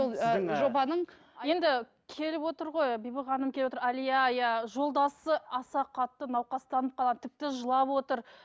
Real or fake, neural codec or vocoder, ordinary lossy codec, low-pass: real; none; none; none